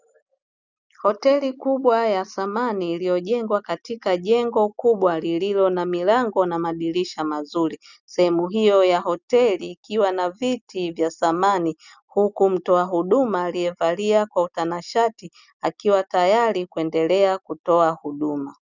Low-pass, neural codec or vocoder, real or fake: 7.2 kHz; none; real